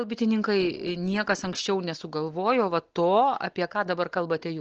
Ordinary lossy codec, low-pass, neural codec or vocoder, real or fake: Opus, 16 kbps; 7.2 kHz; none; real